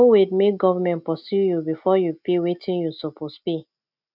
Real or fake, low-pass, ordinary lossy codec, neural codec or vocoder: real; 5.4 kHz; none; none